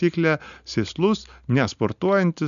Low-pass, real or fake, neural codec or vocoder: 7.2 kHz; real; none